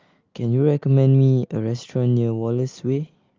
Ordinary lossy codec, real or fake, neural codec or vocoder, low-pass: Opus, 24 kbps; real; none; 7.2 kHz